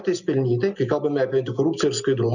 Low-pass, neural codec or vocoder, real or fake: 7.2 kHz; none; real